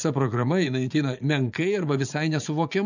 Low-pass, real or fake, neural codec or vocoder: 7.2 kHz; fake; vocoder, 24 kHz, 100 mel bands, Vocos